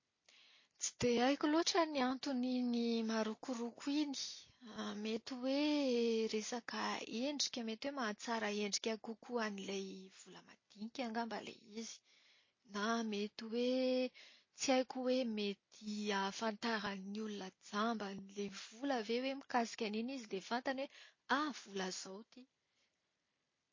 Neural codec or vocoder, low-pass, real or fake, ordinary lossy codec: none; 7.2 kHz; real; MP3, 32 kbps